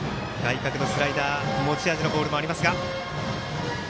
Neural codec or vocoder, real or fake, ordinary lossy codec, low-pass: none; real; none; none